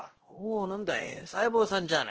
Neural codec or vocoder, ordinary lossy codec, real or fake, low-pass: codec, 16 kHz, 1 kbps, X-Codec, WavLM features, trained on Multilingual LibriSpeech; Opus, 16 kbps; fake; 7.2 kHz